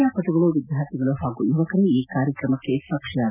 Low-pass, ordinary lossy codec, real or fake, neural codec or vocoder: 3.6 kHz; none; real; none